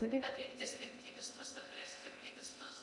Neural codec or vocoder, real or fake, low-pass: codec, 16 kHz in and 24 kHz out, 0.6 kbps, FocalCodec, streaming, 2048 codes; fake; 10.8 kHz